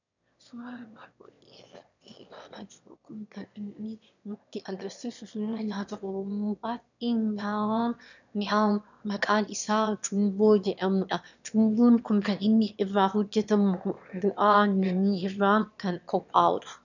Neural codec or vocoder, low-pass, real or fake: autoencoder, 22.05 kHz, a latent of 192 numbers a frame, VITS, trained on one speaker; 7.2 kHz; fake